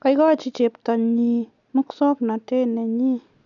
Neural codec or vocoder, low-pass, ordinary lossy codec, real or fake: none; 7.2 kHz; none; real